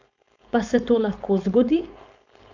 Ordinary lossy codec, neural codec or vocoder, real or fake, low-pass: none; codec, 16 kHz, 4.8 kbps, FACodec; fake; 7.2 kHz